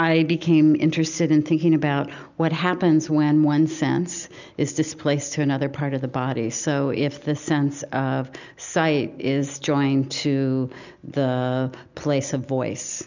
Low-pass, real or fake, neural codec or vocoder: 7.2 kHz; real; none